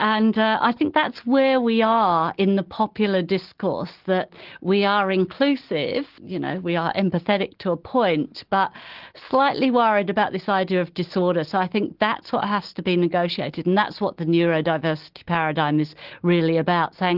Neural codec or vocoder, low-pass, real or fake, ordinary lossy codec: none; 5.4 kHz; real; Opus, 16 kbps